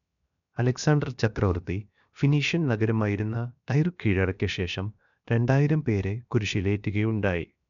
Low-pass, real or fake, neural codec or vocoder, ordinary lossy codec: 7.2 kHz; fake; codec, 16 kHz, 0.7 kbps, FocalCodec; none